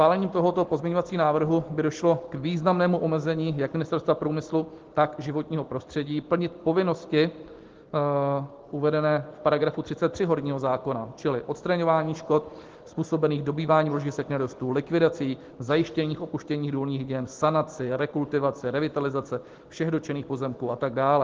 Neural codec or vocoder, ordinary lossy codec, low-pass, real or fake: none; Opus, 16 kbps; 7.2 kHz; real